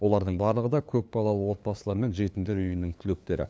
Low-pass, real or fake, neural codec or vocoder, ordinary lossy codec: none; fake; codec, 16 kHz, 2 kbps, FunCodec, trained on LibriTTS, 25 frames a second; none